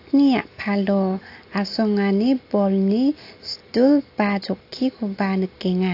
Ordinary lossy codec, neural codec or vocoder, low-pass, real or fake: AAC, 32 kbps; none; 5.4 kHz; real